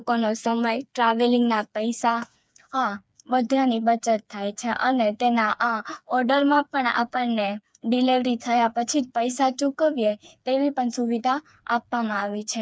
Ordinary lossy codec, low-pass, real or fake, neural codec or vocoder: none; none; fake; codec, 16 kHz, 4 kbps, FreqCodec, smaller model